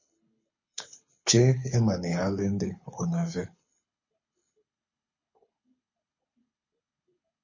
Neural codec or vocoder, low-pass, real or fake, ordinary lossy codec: codec, 24 kHz, 6 kbps, HILCodec; 7.2 kHz; fake; MP3, 32 kbps